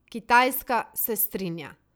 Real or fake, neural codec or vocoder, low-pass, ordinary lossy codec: real; none; none; none